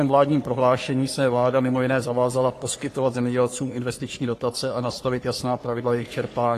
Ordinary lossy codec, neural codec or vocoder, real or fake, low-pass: AAC, 48 kbps; codec, 44.1 kHz, 3.4 kbps, Pupu-Codec; fake; 14.4 kHz